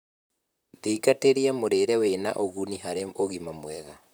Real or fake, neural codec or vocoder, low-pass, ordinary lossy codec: fake; vocoder, 44.1 kHz, 128 mel bands, Pupu-Vocoder; none; none